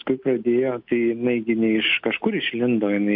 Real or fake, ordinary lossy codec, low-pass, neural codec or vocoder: real; MP3, 48 kbps; 5.4 kHz; none